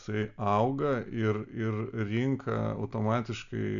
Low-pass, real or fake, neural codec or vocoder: 7.2 kHz; real; none